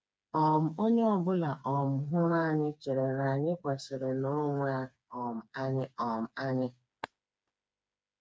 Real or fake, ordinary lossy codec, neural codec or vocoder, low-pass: fake; none; codec, 16 kHz, 4 kbps, FreqCodec, smaller model; none